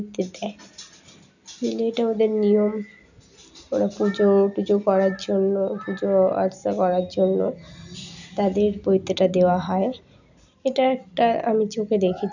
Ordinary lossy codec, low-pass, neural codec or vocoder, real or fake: none; 7.2 kHz; none; real